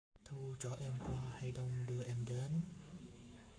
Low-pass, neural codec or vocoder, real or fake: 9.9 kHz; codec, 44.1 kHz, 7.8 kbps, Pupu-Codec; fake